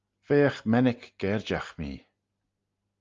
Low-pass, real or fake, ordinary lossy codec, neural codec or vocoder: 7.2 kHz; real; Opus, 32 kbps; none